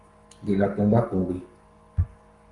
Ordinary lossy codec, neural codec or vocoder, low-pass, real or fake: Opus, 32 kbps; codec, 44.1 kHz, 7.8 kbps, Pupu-Codec; 10.8 kHz; fake